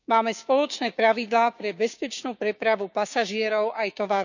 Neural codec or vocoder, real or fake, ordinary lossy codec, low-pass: codec, 16 kHz, 6 kbps, DAC; fake; none; 7.2 kHz